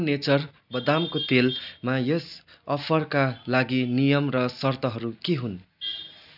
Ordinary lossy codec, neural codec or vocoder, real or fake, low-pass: none; none; real; 5.4 kHz